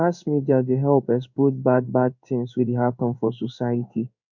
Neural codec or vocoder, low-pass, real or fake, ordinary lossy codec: codec, 16 kHz in and 24 kHz out, 1 kbps, XY-Tokenizer; 7.2 kHz; fake; none